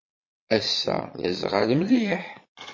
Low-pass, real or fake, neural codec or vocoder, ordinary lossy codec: 7.2 kHz; fake; vocoder, 22.05 kHz, 80 mel bands, Vocos; MP3, 32 kbps